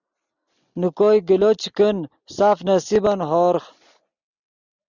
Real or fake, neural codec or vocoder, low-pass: real; none; 7.2 kHz